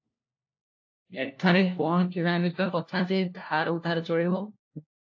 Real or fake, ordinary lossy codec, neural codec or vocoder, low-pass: fake; AAC, 48 kbps; codec, 16 kHz, 1 kbps, FunCodec, trained on LibriTTS, 50 frames a second; 7.2 kHz